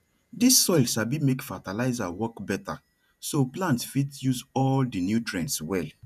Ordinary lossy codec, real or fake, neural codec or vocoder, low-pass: none; fake; vocoder, 48 kHz, 128 mel bands, Vocos; 14.4 kHz